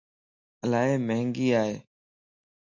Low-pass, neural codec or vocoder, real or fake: 7.2 kHz; none; real